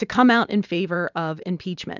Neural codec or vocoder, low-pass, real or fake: vocoder, 44.1 kHz, 128 mel bands every 512 samples, BigVGAN v2; 7.2 kHz; fake